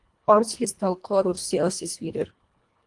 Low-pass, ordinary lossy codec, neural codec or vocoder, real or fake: 10.8 kHz; Opus, 24 kbps; codec, 24 kHz, 1.5 kbps, HILCodec; fake